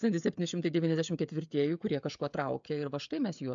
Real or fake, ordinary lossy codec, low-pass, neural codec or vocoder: fake; MP3, 64 kbps; 7.2 kHz; codec, 16 kHz, 16 kbps, FreqCodec, smaller model